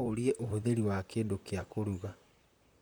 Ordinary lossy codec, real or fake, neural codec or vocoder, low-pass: none; fake; vocoder, 44.1 kHz, 128 mel bands, Pupu-Vocoder; none